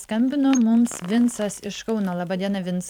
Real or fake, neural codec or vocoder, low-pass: fake; vocoder, 44.1 kHz, 128 mel bands every 512 samples, BigVGAN v2; 19.8 kHz